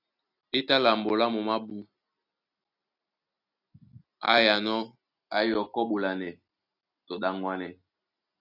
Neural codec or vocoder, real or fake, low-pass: none; real; 5.4 kHz